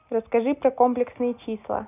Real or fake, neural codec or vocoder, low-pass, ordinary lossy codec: real; none; 3.6 kHz; none